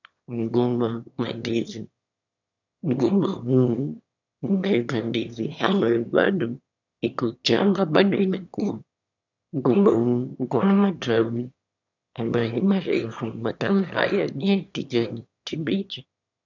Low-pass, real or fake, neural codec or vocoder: 7.2 kHz; fake; autoencoder, 22.05 kHz, a latent of 192 numbers a frame, VITS, trained on one speaker